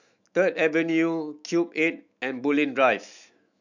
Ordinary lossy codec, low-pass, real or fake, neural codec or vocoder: none; 7.2 kHz; fake; autoencoder, 48 kHz, 128 numbers a frame, DAC-VAE, trained on Japanese speech